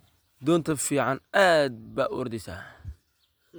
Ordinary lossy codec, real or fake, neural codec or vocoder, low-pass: none; real; none; none